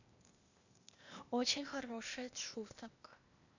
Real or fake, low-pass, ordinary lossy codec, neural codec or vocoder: fake; 7.2 kHz; none; codec, 16 kHz, 0.8 kbps, ZipCodec